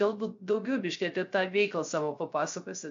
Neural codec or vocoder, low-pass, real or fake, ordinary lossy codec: codec, 16 kHz, 0.3 kbps, FocalCodec; 7.2 kHz; fake; MP3, 48 kbps